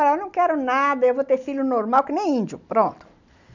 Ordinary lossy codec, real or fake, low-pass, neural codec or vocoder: none; real; 7.2 kHz; none